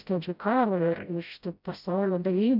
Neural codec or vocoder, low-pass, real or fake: codec, 16 kHz, 0.5 kbps, FreqCodec, smaller model; 5.4 kHz; fake